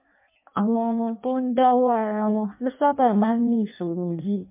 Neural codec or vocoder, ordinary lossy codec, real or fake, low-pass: codec, 16 kHz in and 24 kHz out, 0.6 kbps, FireRedTTS-2 codec; MP3, 24 kbps; fake; 3.6 kHz